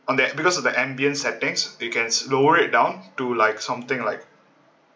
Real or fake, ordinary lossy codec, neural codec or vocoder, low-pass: real; none; none; none